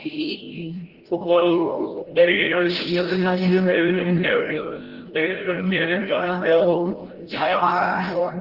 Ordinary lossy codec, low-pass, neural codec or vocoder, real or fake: Opus, 16 kbps; 5.4 kHz; codec, 16 kHz, 0.5 kbps, FreqCodec, larger model; fake